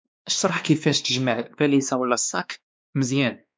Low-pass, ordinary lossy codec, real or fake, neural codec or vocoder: none; none; fake; codec, 16 kHz, 2 kbps, X-Codec, WavLM features, trained on Multilingual LibriSpeech